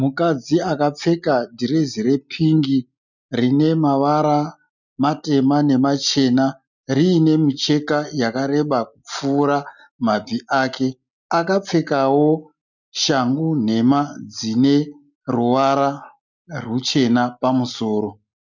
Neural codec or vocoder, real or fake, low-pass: none; real; 7.2 kHz